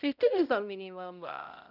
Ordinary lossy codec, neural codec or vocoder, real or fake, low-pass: none; codec, 16 kHz, 0.5 kbps, X-Codec, HuBERT features, trained on balanced general audio; fake; 5.4 kHz